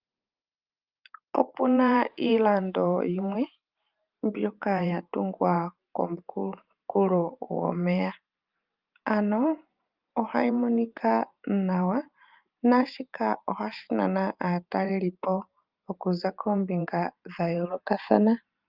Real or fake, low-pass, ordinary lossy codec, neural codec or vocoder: fake; 5.4 kHz; Opus, 24 kbps; vocoder, 44.1 kHz, 128 mel bands every 512 samples, BigVGAN v2